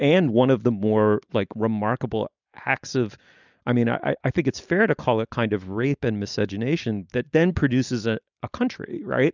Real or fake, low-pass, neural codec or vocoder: real; 7.2 kHz; none